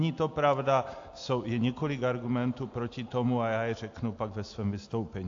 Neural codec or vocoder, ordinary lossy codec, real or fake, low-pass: none; AAC, 48 kbps; real; 7.2 kHz